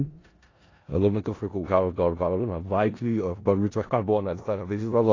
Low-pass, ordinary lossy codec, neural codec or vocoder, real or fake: 7.2 kHz; AAC, 32 kbps; codec, 16 kHz in and 24 kHz out, 0.4 kbps, LongCat-Audio-Codec, four codebook decoder; fake